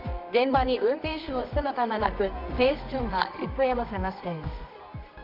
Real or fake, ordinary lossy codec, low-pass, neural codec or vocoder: fake; none; 5.4 kHz; codec, 24 kHz, 0.9 kbps, WavTokenizer, medium music audio release